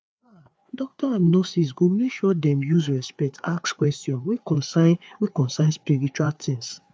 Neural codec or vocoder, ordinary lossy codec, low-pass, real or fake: codec, 16 kHz, 4 kbps, FreqCodec, larger model; none; none; fake